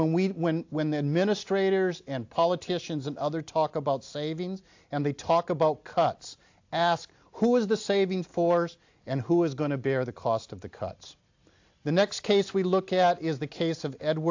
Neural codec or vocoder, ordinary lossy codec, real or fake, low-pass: none; AAC, 48 kbps; real; 7.2 kHz